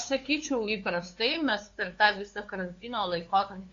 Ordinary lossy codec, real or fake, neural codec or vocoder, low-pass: AAC, 48 kbps; fake; codec, 16 kHz, 2 kbps, FunCodec, trained on LibriTTS, 25 frames a second; 7.2 kHz